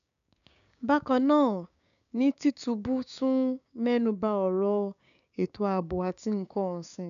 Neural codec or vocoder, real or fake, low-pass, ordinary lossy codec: codec, 16 kHz, 6 kbps, DAC; fake; 7.2 kHz; none